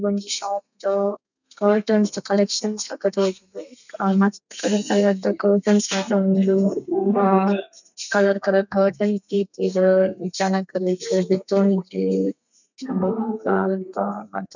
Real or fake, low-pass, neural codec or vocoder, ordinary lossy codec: fake; 7.2 kHz; codec, 32 kHz, 1.9 kbps, SNAC; none